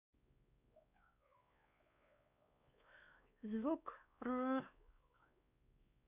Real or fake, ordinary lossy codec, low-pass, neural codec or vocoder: fake; none; 3.6 kHz; codec, 16 kHz, 2 kbps, X-Codec, WavLM features, trained on Multilingual LibriSpeech